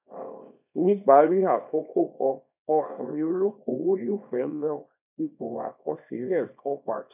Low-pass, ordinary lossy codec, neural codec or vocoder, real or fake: 3.6 kHz; none; codec, 24 kHz, 0.9 kbps, WavTokenizer, small release; fake